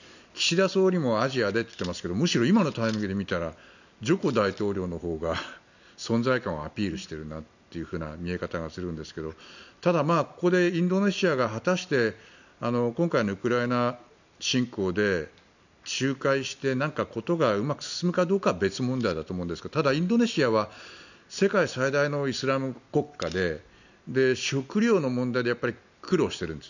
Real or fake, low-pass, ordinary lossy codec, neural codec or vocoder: real; 7.2 kHz; none; none